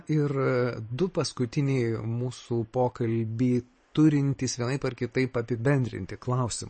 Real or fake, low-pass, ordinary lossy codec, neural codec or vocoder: fake; 10.8 kHz; MP3, 32 kbps; vocoder, 44.1 kHz, 128 mel bands every 512 samples, BigVGAN v2